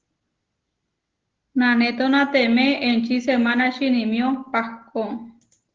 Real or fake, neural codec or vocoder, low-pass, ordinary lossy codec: real; none; 7.2 kHz; Opus, 16 kbps